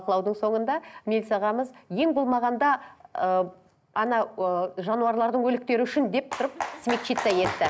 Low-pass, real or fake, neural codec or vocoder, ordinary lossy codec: none; real; none; none